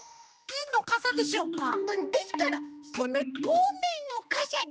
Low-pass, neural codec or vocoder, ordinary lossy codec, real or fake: none; codec, 16 kHz, 1 kbps, X-Codec, HuBERT features, trained on general audio; none; fake